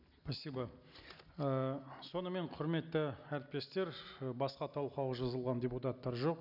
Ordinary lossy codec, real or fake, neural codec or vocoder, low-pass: none; real; none; 5.4 kHz